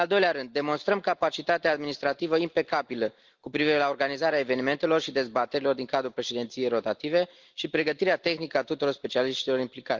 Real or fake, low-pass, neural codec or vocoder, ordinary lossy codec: real; 7.2 kHz; none; Opus, 32 kbps